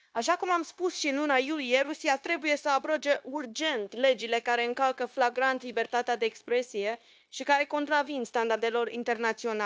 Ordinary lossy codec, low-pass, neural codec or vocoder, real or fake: none; none; codec, 16 kHz, 0.9 kbps, LongCat-Audio-Codec; fake